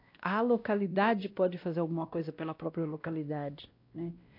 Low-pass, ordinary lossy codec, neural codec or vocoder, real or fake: 5.4 kHz; AAC, 32 kbps; codec, 16 kHz, 1 kbps, X-Codec, WavLM features, trained on Multilingual LibriSpeech; fake